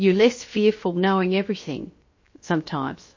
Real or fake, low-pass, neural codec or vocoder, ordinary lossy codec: fake; 7.2 kHz; codec, 16 kHz, 0.7 kbps, FocalCodec; MP3, 32 kbps